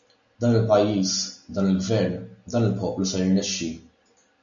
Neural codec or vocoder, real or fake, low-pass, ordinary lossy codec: none; real; 7.2 kHz; MP3, 96 kbps